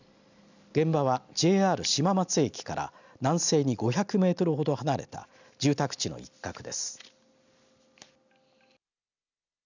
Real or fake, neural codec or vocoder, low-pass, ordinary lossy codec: real; none; 7.2 kHz; none